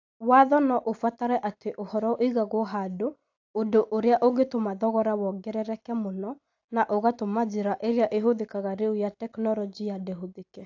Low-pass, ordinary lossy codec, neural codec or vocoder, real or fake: 7.2 kHz; AAC, 48 kbps; none; real